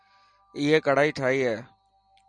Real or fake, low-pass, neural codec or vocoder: real; 9.9 kHz; none